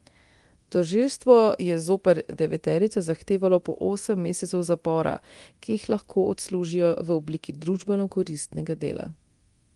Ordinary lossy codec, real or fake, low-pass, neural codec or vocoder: Opus, 24 kbps; fake; 10.8 kHz; codec, 24 kHz, 0.9 kbps, DualCodec